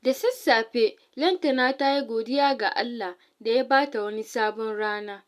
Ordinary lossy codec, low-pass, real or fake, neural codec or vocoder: none; 14.4 kHz; real; none